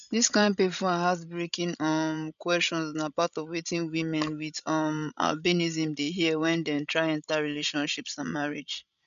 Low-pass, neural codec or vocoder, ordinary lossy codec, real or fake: 7.2 kHz; codec, 16 kHz, 16 kbps, FreqCodec, larger model; none; fake